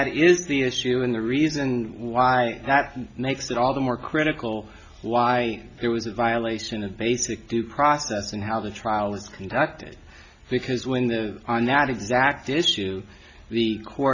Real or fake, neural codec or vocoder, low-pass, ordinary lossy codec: real; none; 7.2 kHz; Opus, 64 kbps